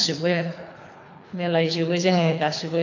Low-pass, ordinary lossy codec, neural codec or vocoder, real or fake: 7.2 kHz; AAC, 48 kbps; codec, 24 kHz, 3 kbps, HILCodec; fake